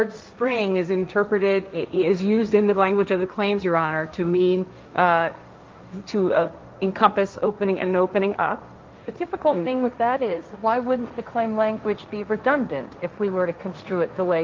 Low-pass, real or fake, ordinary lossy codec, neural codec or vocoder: 7.2 kHz; fake; Opus, 32 kbps; codec, 16 kHz, 1.1 kbps, Voila-Tokenizer